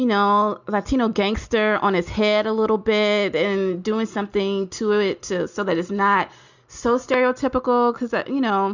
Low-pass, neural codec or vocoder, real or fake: 7.2 kHz; none; real